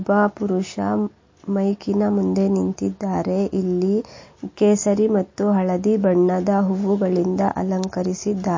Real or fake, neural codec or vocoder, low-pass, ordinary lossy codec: real; none; 7.2 kHz; MP3, 32 kbps